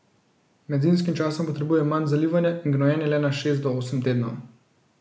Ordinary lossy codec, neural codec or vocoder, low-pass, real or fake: none; none; none; real